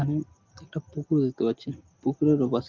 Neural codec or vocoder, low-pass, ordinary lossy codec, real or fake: none; 7.2 kHz; Opus, 32 kbps; real